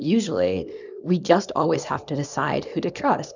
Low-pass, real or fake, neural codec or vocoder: 7.2 kHz; fake; codec, 24 kHz, 0.9 kbps, WavTokenizer, small release